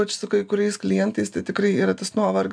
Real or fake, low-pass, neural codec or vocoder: real; 9.9 kHz; none